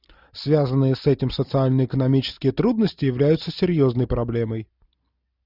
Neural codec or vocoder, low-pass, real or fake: none; 5.4 kHz; real